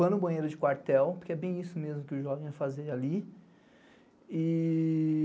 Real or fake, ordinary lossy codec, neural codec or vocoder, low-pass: real; none; none; none